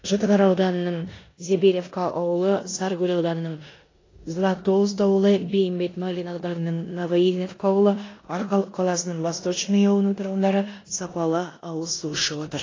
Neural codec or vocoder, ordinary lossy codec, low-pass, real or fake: codec, 16 kHz in and 24 kHz out, 0.9 kbps, LongCat-Audio-Codec, four codebook decoder; AAC, 32 kbps; 7.2 kHz; fake